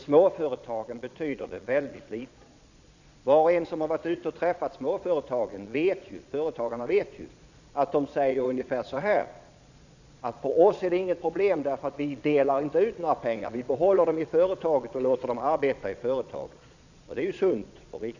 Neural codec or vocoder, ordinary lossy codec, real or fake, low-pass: vocoder, 22.05 kHz, 80 mel bands, Vocos; none; fake; 7.2 kHz